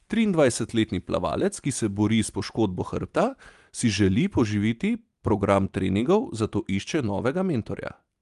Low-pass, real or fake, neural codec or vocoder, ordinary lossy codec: 10.8 kHz; real; none; Opus, 32 kbps